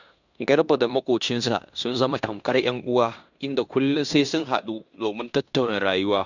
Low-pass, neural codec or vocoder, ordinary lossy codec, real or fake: 7.2 kHz; codec, 16 kHz in and 24 kHz out, 0.9 kbps, LongCat-Audio-Codec, fine tuned four codebook decoder; none; fake